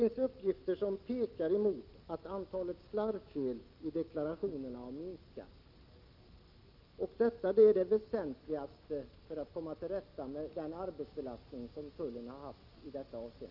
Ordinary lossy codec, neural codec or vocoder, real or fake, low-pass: Opus, 32 kbps; none; real; 5.4 kHz